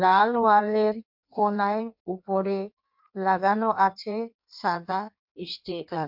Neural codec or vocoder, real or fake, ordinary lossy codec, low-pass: codec, 16 kHz in and 24 kHz out, 1.1 kbps, FireRedTTS-2 codec; fake; MP3, 48 kbps; 5.4 kHz